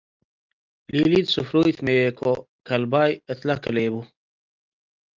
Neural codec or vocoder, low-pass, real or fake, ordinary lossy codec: none; 7.2 kHz; real; Opus, 32 kbps